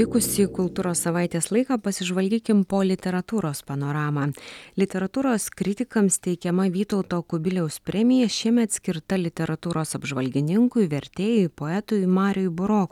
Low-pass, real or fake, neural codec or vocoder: 19.8 kHz; real; none